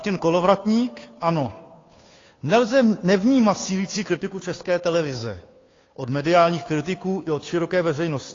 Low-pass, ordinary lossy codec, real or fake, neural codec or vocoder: 7.2 kHz; AAC, 32 kbps; fake; codec, 16 kHz, 2 kbps, FunCodec, trained on Chinese and English, 25 frames a second